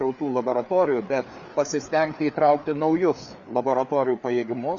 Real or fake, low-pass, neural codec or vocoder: fake; 7.2 kHz; codec, 16 kHz, 4 kbps, FreqCodec, larger model